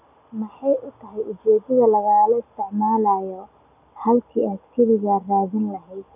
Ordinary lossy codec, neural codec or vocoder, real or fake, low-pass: none; none; real; 3.6 kHz